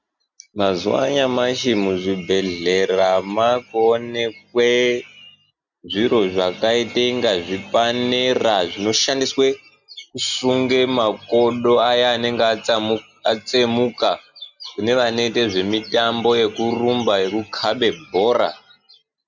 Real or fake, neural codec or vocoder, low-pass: real; none; 7.2 kHz